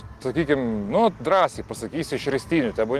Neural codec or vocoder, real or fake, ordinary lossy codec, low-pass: none; real; Opus, 24 kbps; 14.4 kHz